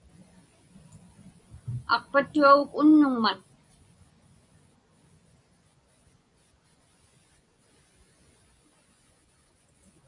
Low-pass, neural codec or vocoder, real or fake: 10.8 kHz; vocoder, 24 kHz, 100 mel bands, Vocos; fake